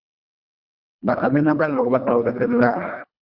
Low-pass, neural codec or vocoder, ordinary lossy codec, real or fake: 5.4 kHz; codec, 24 kHz, 1.5 kbps, HILCodec; Opus, 64 kbps; fake